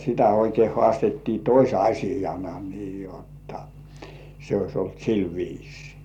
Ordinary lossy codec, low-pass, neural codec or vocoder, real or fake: none; 19.8 kHz; none; real